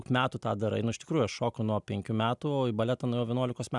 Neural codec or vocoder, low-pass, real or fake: none; 10.8 kHz; real